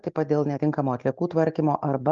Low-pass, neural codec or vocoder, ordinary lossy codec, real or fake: 7.2 kHz; none; Opus, 32 kbps; real